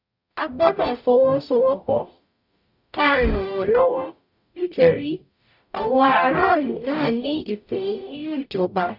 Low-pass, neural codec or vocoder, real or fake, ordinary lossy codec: 5.4 kHz; codec, 44.1 kHz, 0.9 kbps, DAC; fake; none